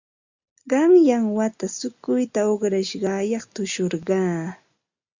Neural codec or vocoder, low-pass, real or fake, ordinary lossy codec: none; 7.2 kHz; real; Opus, 64 kbps